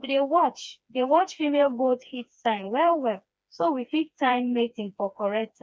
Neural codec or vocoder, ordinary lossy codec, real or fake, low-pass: codec, 16 kHz, 2 kbps, FreqCodec, smaller model; none; fake; none